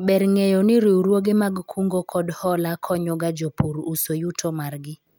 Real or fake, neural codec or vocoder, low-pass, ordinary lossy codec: real; none; none; none